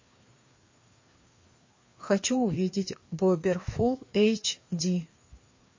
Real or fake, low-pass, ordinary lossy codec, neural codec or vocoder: fake; 7.2 kHz; MP3, 32 kbps; codec, 16 kHz, 2 kbps, FreqCodec, larger model